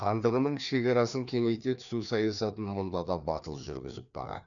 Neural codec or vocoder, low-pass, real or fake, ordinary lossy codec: codec, 16 kHz, 2 kbps, FreqCodec, larger model; 7.2 kHz; fake; none